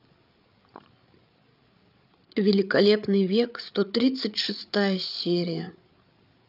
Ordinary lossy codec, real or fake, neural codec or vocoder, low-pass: none; fake; codec, 16 kHz, 8 kbps, FreqCodec, larger model; 5.4 kHz